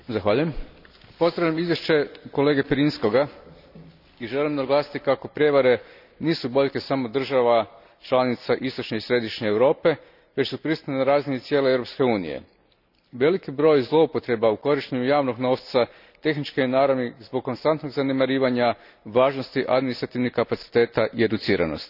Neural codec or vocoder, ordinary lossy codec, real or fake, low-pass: none; none; real; 5.4 kHz